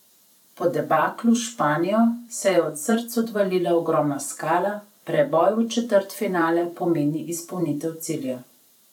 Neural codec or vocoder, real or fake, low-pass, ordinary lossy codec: vocoder, 44.1 kHz, 128 mel bands every 512 samples, BigVGAN v2; fake; 19.8 kHz; none